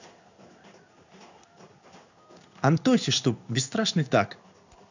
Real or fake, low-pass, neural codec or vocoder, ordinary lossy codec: fake; 7.2 kHz; codec, 16 kHz in and 24 kHz out, 1 kbps, XY-Tokenizer; none